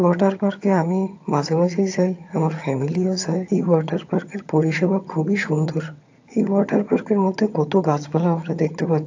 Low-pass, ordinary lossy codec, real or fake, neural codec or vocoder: 7.2 kHz; AAC, 48 kbps; fake; vocoder, 22.05 kHz, 80 mel bands, HiFi-GAN